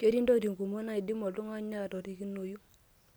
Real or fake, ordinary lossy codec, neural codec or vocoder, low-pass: real; none; none; none